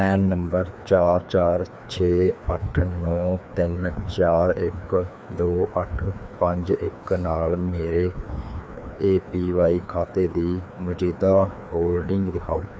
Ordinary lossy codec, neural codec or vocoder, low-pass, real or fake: none; codec, 16 kHz, 2 kbps, FreqCodec, larger model; none; fake